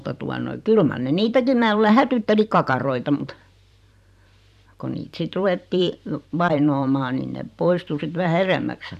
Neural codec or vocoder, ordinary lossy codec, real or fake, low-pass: vocoder, 44.1 kHz, 128 mel bands every 512 samples, BigVGAN v2; none; fake; 14.4 kHz